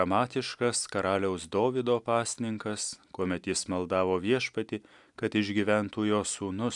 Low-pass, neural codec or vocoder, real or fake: 10.8 kHz; none; real